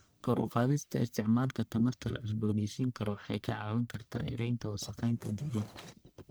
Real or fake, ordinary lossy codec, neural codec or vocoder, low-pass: fake; none; codec, 44.1 kHz, 1.7 kbps, Pupu-Codec; none